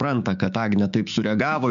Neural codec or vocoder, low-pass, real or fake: codec, 16 kHz, 6 kbps, DAC; 7.2 kHz; fake